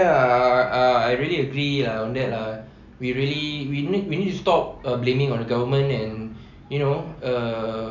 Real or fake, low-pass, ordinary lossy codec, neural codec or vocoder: real; 7.2 kHz; none; none